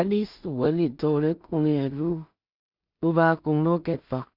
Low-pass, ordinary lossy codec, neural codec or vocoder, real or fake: 5.4 kHz; Opus, 64 kbps; codec, 16 kHz in and 24 kHz out, 0.4 kbps, LongCat-Audio-Codec, two codebook decoder; fake